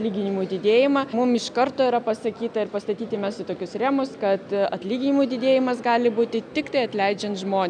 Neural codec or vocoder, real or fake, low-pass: none; real; 9.9 kHz